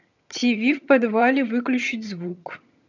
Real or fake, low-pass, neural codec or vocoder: fake; 7.2 kHz; vocoder, 22.05 kHz, 80 mel bands, HiFi-GAN